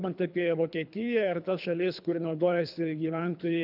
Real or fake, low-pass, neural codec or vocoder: fake; 5.4 kHz; codec, 24 kHz, 3 kbps, HILCodec